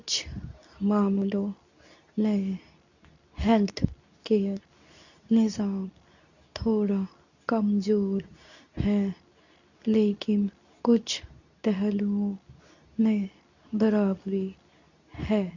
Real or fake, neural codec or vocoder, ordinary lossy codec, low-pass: fake; codec, 24 kHz, 0.9 kbps, WavTokenizer, medium speech release version 2; none; 7.2 kHz